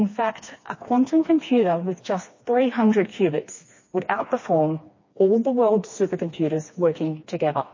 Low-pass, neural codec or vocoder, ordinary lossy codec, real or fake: 7.2 kHz; codec, 16 kHz, 2 kbps, FreqCodec, smaller model; MP3, 32 kbps; fake